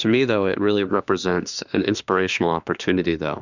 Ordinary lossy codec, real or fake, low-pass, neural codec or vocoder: Opus, 64 kbps; fake; 7.2 kHz; autoencoder, 48 kHz, 32 numbers a frame, DAC-VAE, trained on Japanese speech